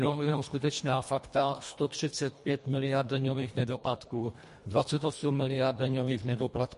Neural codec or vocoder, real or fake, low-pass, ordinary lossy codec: codec, 24 kHz, 1.5 kbps, HILCodec; fake; 10.8 kHz; MP3, 48 kbps